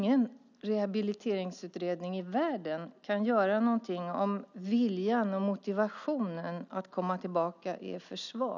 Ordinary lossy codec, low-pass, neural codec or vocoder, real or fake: none; 7.2 kHz; none; real